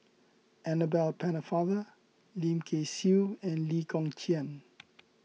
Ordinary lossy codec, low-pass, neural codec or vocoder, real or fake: none; none; none; real